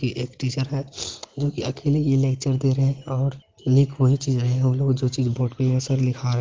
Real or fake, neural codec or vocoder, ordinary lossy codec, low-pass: real; none; Opus, 16 kbps; 7.2 kHz